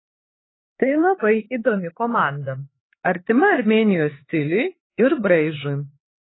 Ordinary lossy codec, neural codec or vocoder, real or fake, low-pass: AAC, 16 kbps; codec, 16 kHz, 4 kbps, X-Codec, HuBERT features, trained on LibriSpeech; fake; 7.2 kHz